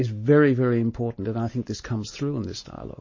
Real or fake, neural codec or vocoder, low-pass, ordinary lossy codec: real; none; 7.2 kHz; MP3, 32 kbps